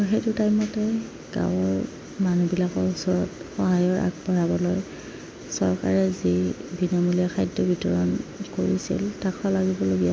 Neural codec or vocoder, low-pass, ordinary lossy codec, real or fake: none; none; none; real